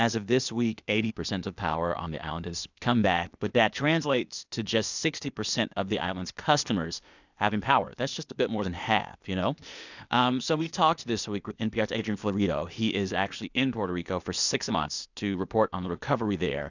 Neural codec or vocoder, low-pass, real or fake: codec, 16 kHz, 0.8 kbps, ZipCodec; 7.2 kHz; fake